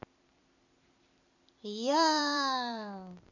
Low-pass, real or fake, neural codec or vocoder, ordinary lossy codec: 7.2 kHz; fake; vocoder, 44.1 kHz, 128 mel bands every 256 samples, BigVGAN v2; none